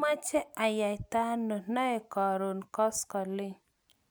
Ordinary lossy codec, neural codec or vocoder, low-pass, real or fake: none; none; none; real